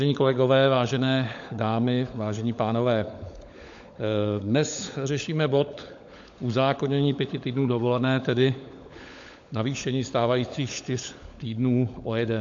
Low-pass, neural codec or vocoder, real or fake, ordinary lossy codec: 7.2 kHz; codec, 16 kHz, 16 kbps, FunCodec, trained on LibriTTS, 50 frames a second; fake; AAC, 64 kbps